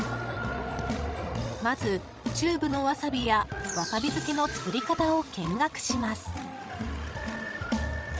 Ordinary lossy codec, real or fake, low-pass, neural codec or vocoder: none; fake; none; codec, 16 kHz, 16 kbps, FreqCodec, larger model